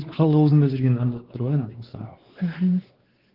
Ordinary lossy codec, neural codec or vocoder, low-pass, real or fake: Opus, 16 kbps; codec, 16 kHz, 2 kbps, X-Codec, WavLM features, trained on Multilingual LibriSpeech; 5.4 kHz; fake